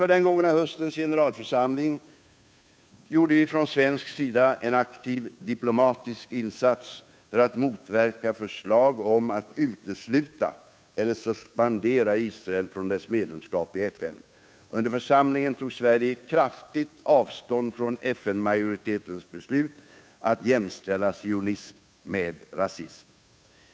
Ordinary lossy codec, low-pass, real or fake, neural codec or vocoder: none; none; fake; codec, 16 kHz, 2 kbps, FunCodec, trained on Chinese and English, 25 frames a second